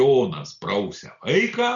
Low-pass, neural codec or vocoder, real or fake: 7.2 kHz; none; real